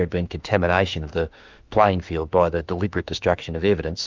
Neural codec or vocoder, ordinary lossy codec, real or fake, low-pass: autoencoder, 48 kHz, 32 numbers a frame, DAC-VAE, trained on Japanese speech; Opus, 24 kbps; fake; 7.2 kHz